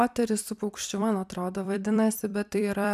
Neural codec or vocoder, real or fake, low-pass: vocoder, 44.1 kHz, 128 mel bands every 256 samples, BigVGAN v2; fake; 14.4 kHz